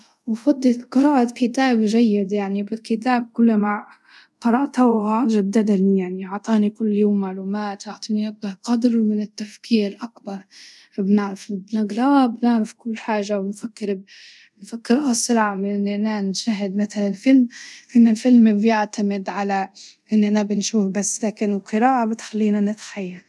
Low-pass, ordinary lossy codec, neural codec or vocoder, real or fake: none; none; codec, 24 kHz, 0.5 kbps, DualCodec; fake